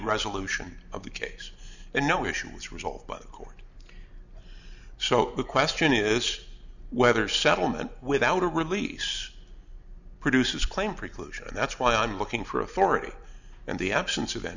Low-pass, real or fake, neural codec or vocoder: 7.2 kHz; real; none